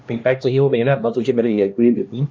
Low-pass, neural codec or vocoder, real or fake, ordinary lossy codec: none; codec, 16 kHz, 1 kbps, X-Codec, HuBERT features, trained on LibriSpeech; fake; none